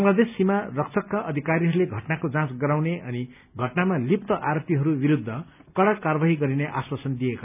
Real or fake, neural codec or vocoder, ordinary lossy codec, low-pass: real; none; none; 3.6 kHz